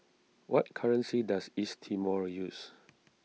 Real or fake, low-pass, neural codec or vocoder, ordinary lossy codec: real; none; none; none